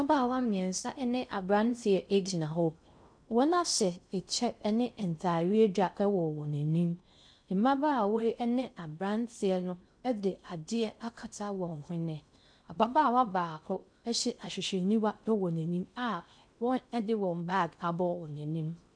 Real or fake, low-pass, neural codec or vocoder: fake; 9.9 kHz; codec, 16 kHz in and 24 kHz out, 0.6 kbps, FocalCodec, streaming, 4096 codes